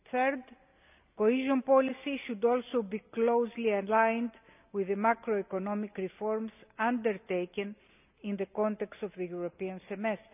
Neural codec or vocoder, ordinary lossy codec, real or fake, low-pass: none; none; real; 3.6 kHz